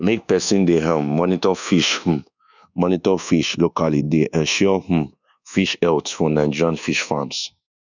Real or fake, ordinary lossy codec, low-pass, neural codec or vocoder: fake; none; 7.2 kHz; codec, 24 kHz, 1.2 kbps, DualCodec